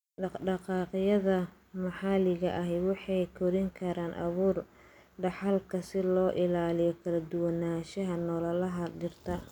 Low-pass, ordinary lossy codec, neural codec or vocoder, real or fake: 19.8 kHz; none; none; real